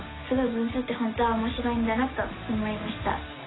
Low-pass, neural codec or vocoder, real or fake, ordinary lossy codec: 7.2 kHz; none; real; AAC, 16 kbps